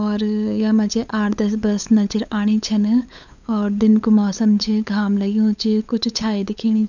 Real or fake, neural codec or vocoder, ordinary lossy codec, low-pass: fake; codec, 16 kHz, 8 kbps, FunCodec, trained on LibriTTS, 25 frames a second; none; 7.2 kHz